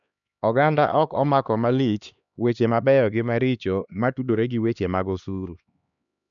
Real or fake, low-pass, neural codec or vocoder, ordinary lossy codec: fake; 7.2 kHz; codec, 16 kHz, 2 kbps, X-Codec, HuBERT features, trained on LibriSpeech; none